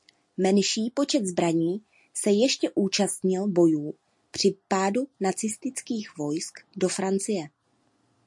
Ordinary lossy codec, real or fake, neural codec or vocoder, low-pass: MP3, 48 kbps; real; none; 10.8 kHz